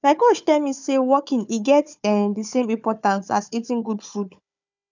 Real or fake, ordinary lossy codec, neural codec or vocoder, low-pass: fake; none; codec, 16 kHz, 4 kbps, FunCodec, trained on Chinese and English, 50 frames a second; 7.2 kHz